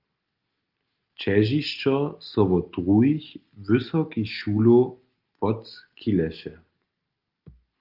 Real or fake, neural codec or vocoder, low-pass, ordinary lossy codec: real; none; 5.4 kHz; Opus, 24 kbps